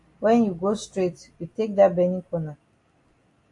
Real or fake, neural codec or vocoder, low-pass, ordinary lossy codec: real; none; 10.8 kHz; AAC, 48 kbps